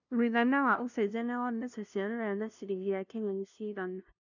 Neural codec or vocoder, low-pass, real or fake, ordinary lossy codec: codec, 16 kHz, 0.5 kbps, FunCodec, trained on LibriTTS, 25 frames a second; 7.2 kHz; fake; none